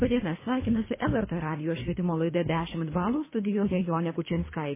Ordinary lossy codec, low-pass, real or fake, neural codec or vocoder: MP3, 16 kbps; 3.6 kHz; fake; codec, 24 kHz, 3 kbps, HILCodec